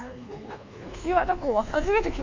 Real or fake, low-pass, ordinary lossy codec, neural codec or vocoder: fake; 7.2 kHz; MP3, 64 kbps; codec, 24 kHz, 1.2 kbps, DualCodec